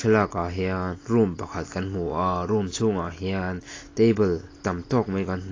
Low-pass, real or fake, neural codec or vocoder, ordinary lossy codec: 7.2 kHz; real; none; AAC, 32 kbps